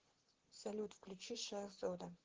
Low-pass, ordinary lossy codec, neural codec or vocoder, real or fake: 7.2 kHz; Opus, 16 kbps; vocoder, 44.1 kHz, 128 mel bands, Pupu-Vocoder; fake